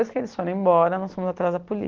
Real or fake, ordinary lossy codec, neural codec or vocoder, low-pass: real; Opus, 16 kbps; none; 7.2 kHz